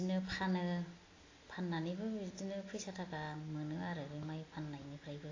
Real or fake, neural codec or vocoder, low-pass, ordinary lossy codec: real; none; 7.2 kHz; AAC, 32 kbps